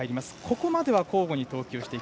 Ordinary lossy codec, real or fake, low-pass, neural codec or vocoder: none; real; none; none